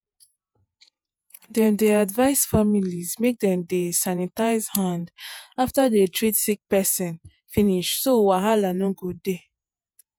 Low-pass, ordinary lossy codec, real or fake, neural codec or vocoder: none; none; fake; vocoder, 48 kHz, 128 mel bands, Vocos